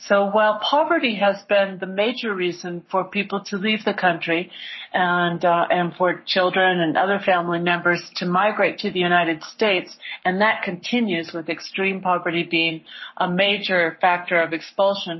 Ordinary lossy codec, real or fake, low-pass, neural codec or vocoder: MP3, 24 kbps; fake; 7.2 kHz; codec, 44.1 kHz, 7.8 kbps, Pupu-Codec